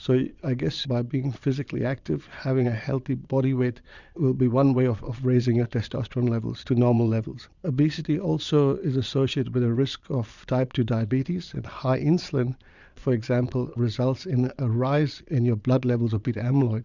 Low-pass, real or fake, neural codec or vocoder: 7.2 kHz; fake; vocoder, 22.05 kHz, 80 mel bands, Vocos